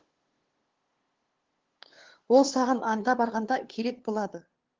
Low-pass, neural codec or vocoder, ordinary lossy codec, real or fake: 7.2 kHz; codec, 16 kHz, 2 kbps, FunCodec, trained on LibriTTS, 25 frames a second; Opus, 16 kbps; fake